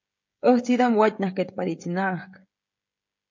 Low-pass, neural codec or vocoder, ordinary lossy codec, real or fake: 7.2 kHz; codec, 16 kHz, 16 kbps, FreqCodec, smaller model; MP3, 48 kbps; fake